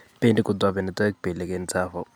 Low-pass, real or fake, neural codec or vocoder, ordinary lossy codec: none; real; none; none